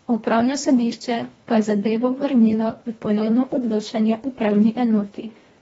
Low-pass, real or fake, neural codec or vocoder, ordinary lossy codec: 10.8 kHz; fake; codec, 24 kHz, 1.5 kbps, HILCodec; AAC, 24 kbps